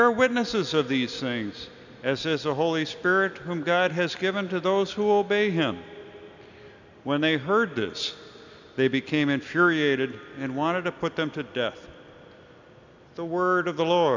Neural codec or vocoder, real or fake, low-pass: none; real; 7.2 kHz